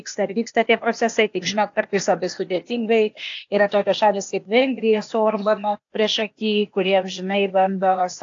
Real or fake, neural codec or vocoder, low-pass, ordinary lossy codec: fake; codec, 16 kHz, 0.8 kbps, ZipCodec; 7.2 kHz; AAC, 48 kbps